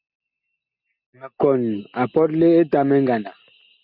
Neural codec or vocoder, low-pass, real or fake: none; 5.4 kHz; real